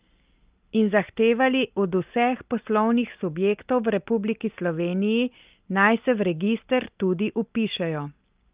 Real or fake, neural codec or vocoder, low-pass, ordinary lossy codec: real; none; 3.6 kHz; Opus, 24 kbps